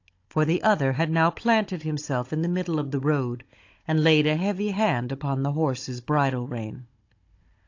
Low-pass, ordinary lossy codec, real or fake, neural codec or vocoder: 7.2 kHz; AAC, 48 kbps; fake; codec, 16 kHz, 16 kbps, FunCodec, trained on Chinese and English, 50 frames a second